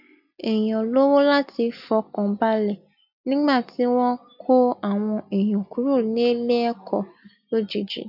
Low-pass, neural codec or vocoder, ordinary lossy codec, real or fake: 5.4 kHz; none; none; real